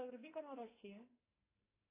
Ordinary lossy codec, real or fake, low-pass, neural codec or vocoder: AAC, 16 kbps; fake; 3.6 kHz; codec, 44.1 kHz, 2.6 kbps, SNAC